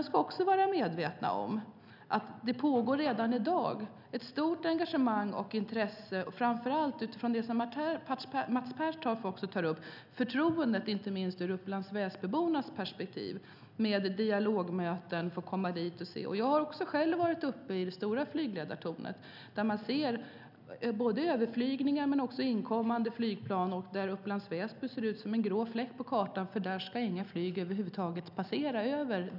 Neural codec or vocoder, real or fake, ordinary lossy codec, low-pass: none; real; none; 5.4 kHz